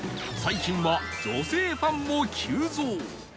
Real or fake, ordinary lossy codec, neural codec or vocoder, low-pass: real; none; none; none